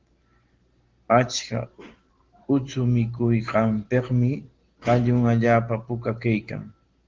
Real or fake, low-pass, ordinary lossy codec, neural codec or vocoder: real; 7.2 kHz; Opus, 16 kbps; none